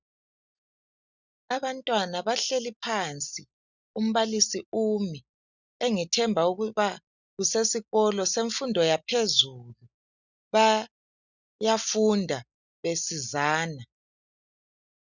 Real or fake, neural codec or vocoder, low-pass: real; none; 7.2 kHz